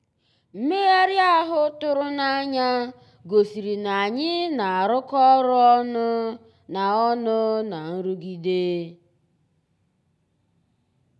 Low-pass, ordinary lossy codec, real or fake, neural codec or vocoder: none; none; real; none